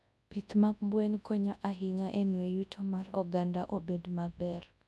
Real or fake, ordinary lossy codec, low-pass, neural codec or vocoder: fake; none; none; codec, 24 kHz, 0.9 kbps, WavTokenizer, large speech release